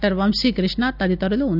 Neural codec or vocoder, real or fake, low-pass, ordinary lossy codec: none; real; 5.4 kHz; none